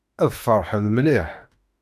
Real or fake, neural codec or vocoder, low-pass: fake; autoencoder, 48 kHz, 32 numbers a frame, DAC-VAE, trained on Japanese speech; 14.4 kHz